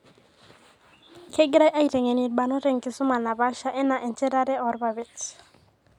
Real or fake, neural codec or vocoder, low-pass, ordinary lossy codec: real; none; 19.8 kHz; none